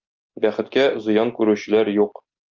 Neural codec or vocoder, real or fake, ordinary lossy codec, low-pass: none; real; Opus, 24 kbps; 7.2 kHz